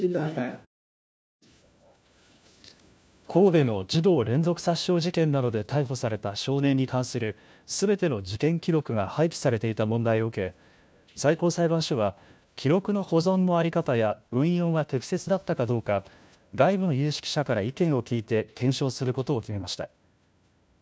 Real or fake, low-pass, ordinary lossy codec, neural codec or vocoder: fake; none; none; codec, 16 kHz, 1 kbps, FunCodec, trained on LibriTTS, 50 frames a second